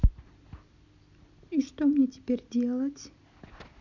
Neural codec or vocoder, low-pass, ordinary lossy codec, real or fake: none; 7.2 kHz; none; real